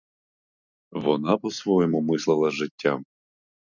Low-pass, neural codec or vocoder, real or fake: 7.2 kHz; none; real